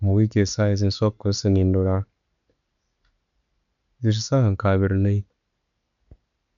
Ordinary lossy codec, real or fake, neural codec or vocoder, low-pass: none; real; none; 7.2 kHz